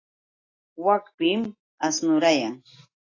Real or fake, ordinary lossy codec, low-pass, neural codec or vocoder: real; AAC, 32 kbps; 7.2 kHz; none